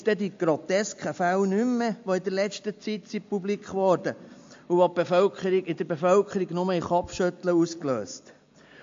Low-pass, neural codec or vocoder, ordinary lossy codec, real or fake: 7.2 kHz; none; MP3, 48 kbps; real